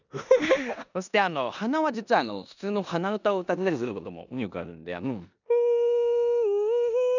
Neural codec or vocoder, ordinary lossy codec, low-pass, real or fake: codec, 16 kHz in and 24 kHz out, 0.9 kbps, LongCat-Audio-Codec, four codebook decoder; none; 7.2 kHz; fake